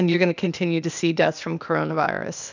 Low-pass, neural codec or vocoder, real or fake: 7.2 kHz; codec, 16 kHz, 0.8 kbps, ZipCodec; fake